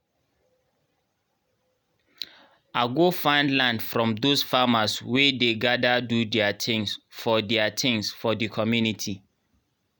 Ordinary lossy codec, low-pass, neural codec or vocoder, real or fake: none; none; none; real